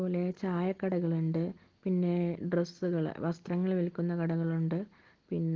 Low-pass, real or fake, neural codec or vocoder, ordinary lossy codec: 7.2 kHz; real; none; Opus, 16 kbps